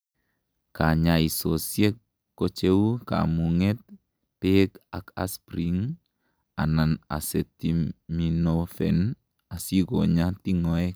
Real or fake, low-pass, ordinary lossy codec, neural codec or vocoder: real; none; none; none